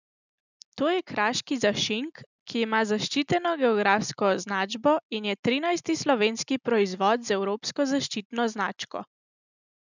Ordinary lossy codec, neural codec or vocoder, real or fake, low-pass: none; none; real; 7.2 kHz